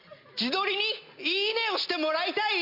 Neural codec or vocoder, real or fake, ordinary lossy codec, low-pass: none; real; MP3, 32 kbps; 5.4 kHz